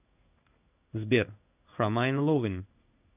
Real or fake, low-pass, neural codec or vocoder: fake; 3.6 kHz; codec, 16 kHz in and 24 kHz out, 1 kbps, XY-Tokenizer